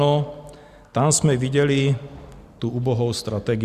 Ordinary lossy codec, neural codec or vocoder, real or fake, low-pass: Opus, 64 kbps; none; real; 14.4 kHz